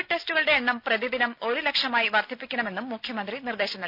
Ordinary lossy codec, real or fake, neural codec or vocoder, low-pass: none; real; none; 5.4 kHz